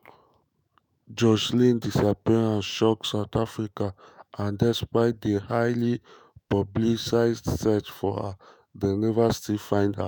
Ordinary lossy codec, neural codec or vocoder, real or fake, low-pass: none; autoencoder, 48 kHz, 128 numbers a frame, DAC-VAE, trained on Japanese speech; fake; none